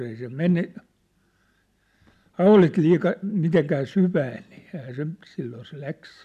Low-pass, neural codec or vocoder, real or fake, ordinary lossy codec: 14.4 kHz; none; real; MP3, 96 kbps